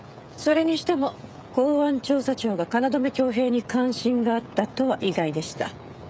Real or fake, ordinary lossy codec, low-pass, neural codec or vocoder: fake; none; none; codec, 16 kHz, 16 kbps, FreqCodec, smaller model